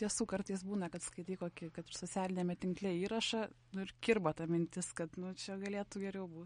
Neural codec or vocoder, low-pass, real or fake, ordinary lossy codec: none; 9.9 kHz; real; MP3, 48 kbps